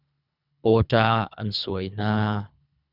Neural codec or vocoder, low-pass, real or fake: codec, 24 kHz, 3 kbps, HILCodec; 5.4 kHz; fake